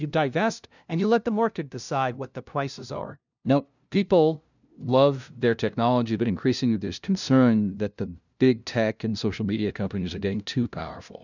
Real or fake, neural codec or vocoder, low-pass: fake; codec, 16 kHz, 0.5 kbps, FunCodec, trained on LibriTTS, 25 frames a second; 7.2 kHz